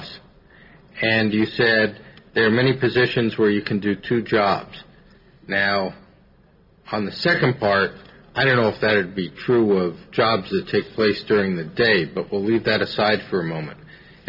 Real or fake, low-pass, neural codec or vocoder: real; 5.4 kHz; none